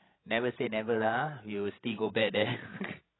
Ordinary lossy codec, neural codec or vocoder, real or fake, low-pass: AAC, 16 kbps; codec, 16 kHz, 16 kbps, FreqCodec, larger model; fake; 7.2 kHz